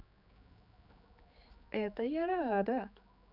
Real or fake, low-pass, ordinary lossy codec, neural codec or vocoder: fake; 5.4 kHz; none; codec, 16 kHz, 4 kbps, X-Codec, HuBERT features, trained on general audio